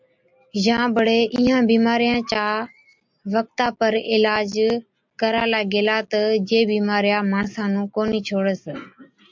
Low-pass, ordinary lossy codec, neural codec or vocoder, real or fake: 7.2 kHz; MP3, 64 kbps; none; real